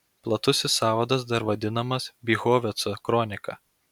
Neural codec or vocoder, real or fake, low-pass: none; real; 19.8 kHz